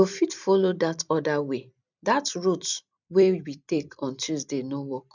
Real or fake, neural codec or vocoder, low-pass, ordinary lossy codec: fake; vocoder, 44.1 kHz, 128 mel bands every 256 samples, BigVGAN v2; 7.2 kHz; none